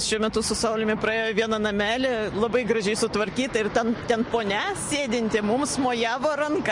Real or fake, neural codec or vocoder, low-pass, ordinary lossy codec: real; none; 10.8 kHz; MP3, 48 kbps